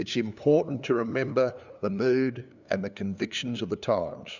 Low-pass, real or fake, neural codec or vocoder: 7.2 kHz; fake; codec, 16 kHz, 2 kbps, FunCodec, trained on LibriTTS, 25 frames a second